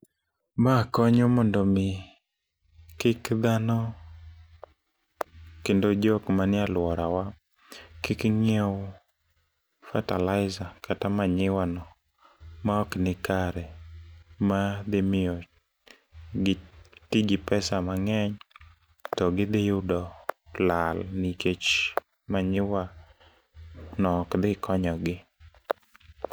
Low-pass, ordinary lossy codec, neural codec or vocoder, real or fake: none; none; none; real